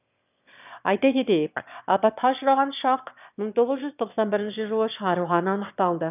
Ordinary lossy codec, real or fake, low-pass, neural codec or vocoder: none; fake; 3.6 kHz; autoencoder, 22.05 kHz, a latent of 192 numbers a frame, VITS, trained on one speaker